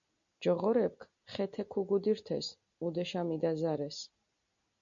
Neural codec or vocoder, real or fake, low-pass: none; real; 7.2 kHz